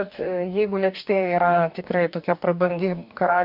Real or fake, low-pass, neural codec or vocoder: fake; 5.4 kHz; codec, 44.1 kHz, 2.6 kbps, DAC